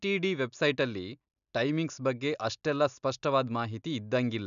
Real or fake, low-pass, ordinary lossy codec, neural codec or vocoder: real; 7.2 kHz; none; none